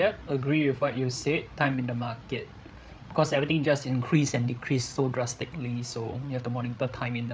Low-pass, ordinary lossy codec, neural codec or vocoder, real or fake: none; none; codec, 16 kHz, 8 kbps, FreqCodec, larger model; fake